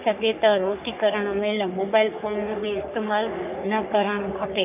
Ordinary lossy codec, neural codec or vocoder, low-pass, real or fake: none; codec, 44.1 kHz, 3.4 kbps, Pupu-Codec; 3.6 kHz; fake